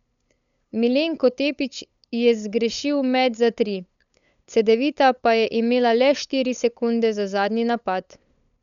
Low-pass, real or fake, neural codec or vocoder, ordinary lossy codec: 7.2 kHz; fake; codec, 16 kHz, 8 kbps, FunCodec, trained on LibriTTS, 25 frames a second; none